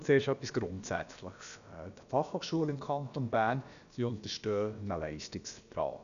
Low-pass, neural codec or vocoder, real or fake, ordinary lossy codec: 7.2 kHz; codec, 16 kHz, about 1 kbps, DyCAST, with the encoder's durations; fake; MP3, 96 kbps